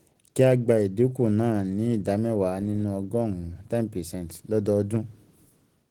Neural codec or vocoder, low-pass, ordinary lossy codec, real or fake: none; 19.8 kHz; Opus, 16 kbps; real